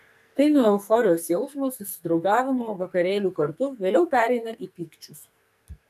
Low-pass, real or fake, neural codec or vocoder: 14.4 kHz; fake; codec, 44.1 kHz, 2.6 kbps, SNAC